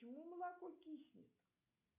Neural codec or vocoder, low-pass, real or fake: none; 3.6 kHz; real